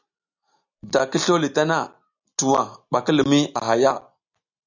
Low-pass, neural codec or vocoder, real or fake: 7.2 kHz; none; real